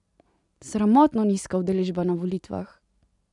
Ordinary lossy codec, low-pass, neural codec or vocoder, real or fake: none; 10.8 kHz; none; real